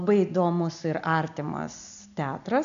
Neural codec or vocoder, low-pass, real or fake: none; 7.2 kHz; real